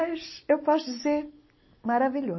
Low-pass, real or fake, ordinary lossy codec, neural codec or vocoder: 7.2 kHz; real; MP3, 24 kbps; none